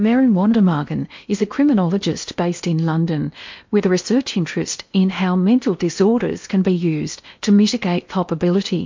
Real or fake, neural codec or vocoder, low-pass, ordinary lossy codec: fake; codec, 16 kHz in and 24 kHz out, 0.8 kbps, FocalCodec, streaming, 65536 codes; 7.2 kHz; MP3, 48 kbps